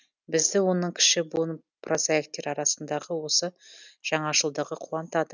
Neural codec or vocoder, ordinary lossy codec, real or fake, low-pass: none; none; real; 7.2 kHz